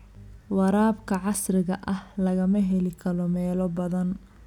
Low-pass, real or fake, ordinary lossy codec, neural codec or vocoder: 19.8 kHz; real; none; none